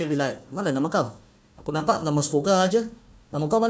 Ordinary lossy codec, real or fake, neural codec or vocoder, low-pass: none; fake; codec, 16 kHz, 1 kbps, FunCodec, trained on Chinese and English, 50 frames a second; none